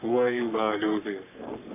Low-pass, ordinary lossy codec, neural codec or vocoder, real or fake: 3.6 kHz; none; codec, 44.1 kHz, 3.4 kbps, Pupu-Codec; fake